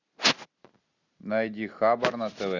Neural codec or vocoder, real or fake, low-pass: none; real; 7.2 kHz